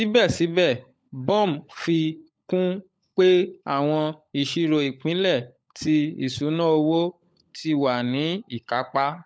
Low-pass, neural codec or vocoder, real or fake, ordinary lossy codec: none; codec, 16 kHz, 16 kbps, FunCodec, trained on LibriTTS, 50 frames a second; fake; none